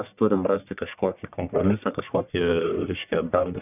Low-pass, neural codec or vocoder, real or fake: 3.6 kHz; codec, 44.1 kHz, 1.7 kbps, Pupu-Codec; fake